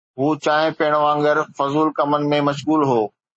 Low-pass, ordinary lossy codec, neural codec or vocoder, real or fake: 9.9 kHz; MP3, 32 kbps; none; real